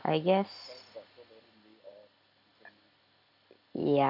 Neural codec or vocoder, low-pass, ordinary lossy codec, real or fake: none; 5.4 kHz; none; real